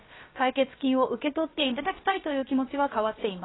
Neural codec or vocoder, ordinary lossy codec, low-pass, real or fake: codec, 16 kHz, about 1 kbps, DyCAST, with the encoder's durations; AAC, 16 kbps; 7.2 kHz; fake